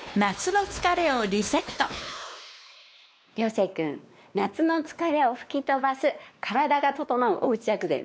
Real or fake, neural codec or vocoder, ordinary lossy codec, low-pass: fake; codec, 16 kHz, 2 kbps, X-Codec, WavLM features, trained on Multilingual LibriSpeech; none; none